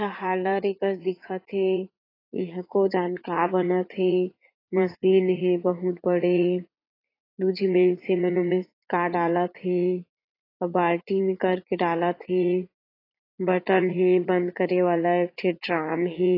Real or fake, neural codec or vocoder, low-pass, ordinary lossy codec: fake; vocoder, 44.1 kHz, 80 mel bands, Vocos; 5.4 kHz; AAC, 24 kbps